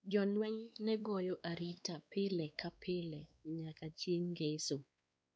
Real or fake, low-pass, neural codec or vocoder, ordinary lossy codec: fake; none; codec, 16 kHz, 2 kbps, X-Codec, WavLM features, trained on Multilingual LibriSpeech; none